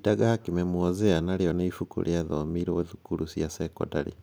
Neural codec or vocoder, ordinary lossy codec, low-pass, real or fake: none; none; none; real